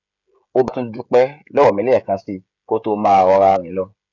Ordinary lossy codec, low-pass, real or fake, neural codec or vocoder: none; 7.2 kHz; fake; codec, 16 kHz, 16 kbps, FreqCodec, smaller model